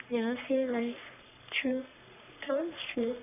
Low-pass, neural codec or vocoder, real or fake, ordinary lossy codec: 3.6 kHz; codec, 44.1 kHz, 3.4 kbps, Pupu-Codec; fake; none